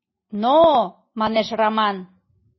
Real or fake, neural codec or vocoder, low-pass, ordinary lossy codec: real; none; 7.2 kHz; MP3, 24 kbps